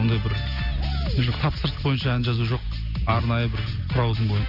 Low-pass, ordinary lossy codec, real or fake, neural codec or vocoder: 5.4 kHz; none; real; none